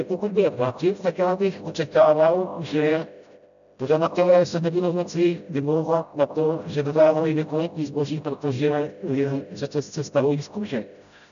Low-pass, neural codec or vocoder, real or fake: 7.2 kHz; codec, 16 kHz, 0.5 kbps, FreqCodec, smaller model; fake